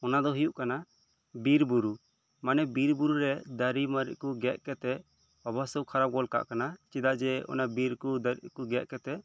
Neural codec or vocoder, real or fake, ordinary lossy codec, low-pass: none; real; none; none